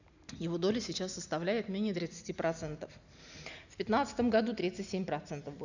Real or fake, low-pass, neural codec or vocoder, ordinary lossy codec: real; 7.2 kHz; none; none